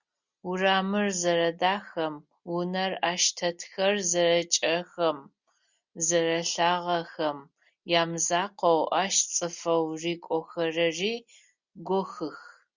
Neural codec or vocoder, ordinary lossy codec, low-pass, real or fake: none; Opus, 64 kbps; 7.2 kHz; real